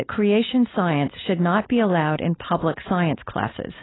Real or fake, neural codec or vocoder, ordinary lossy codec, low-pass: fake; codec, 16 kHz, 4.8 kbps, FACodec; AAC, 16 kbps; 7.2 kHz